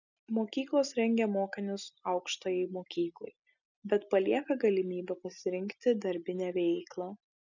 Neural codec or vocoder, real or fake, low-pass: none; real; 7.2 kHz